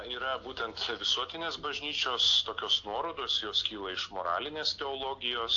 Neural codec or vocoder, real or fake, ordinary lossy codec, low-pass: none; real; AAC, 48 kbps; 7.2 kHz